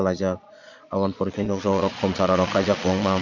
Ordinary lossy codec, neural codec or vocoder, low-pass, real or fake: none; vocoder, 22.05 kHz, 80 mel bands, Vocos; 7.2 kHz; fake